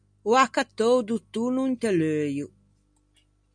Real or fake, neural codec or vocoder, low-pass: real; none; 9.9 kHz